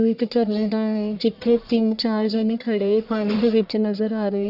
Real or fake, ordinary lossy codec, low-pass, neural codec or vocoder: fake; none; 5.4 kHz; codec, 44.1 kHz, 1.7 kbps, Pupu-Codec